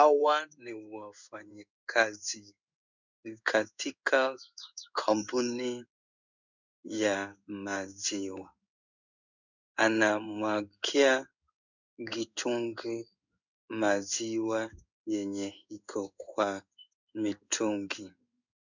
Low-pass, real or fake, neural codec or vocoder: 7.2 kHz; fake; codec, 16 kHz in and 24 kHz out, 1 kbps, XY-Tokenizer